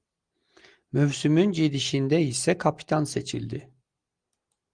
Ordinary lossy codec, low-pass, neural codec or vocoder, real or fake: Opus, 24 kbps; 9.9 kHz; none; real